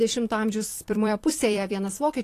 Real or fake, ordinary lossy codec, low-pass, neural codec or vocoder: fake; AAC, 48 kbps; 14.4 kHz; vocoder, 44.1 kHz, 128 mel bands every 256 samples, BigVGAN v2